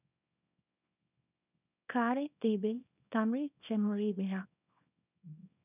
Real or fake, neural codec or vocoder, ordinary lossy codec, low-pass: fake; codec, 24 kHz, 0.9 kbps, WavTokenizer, small release; none; 3.6 kHz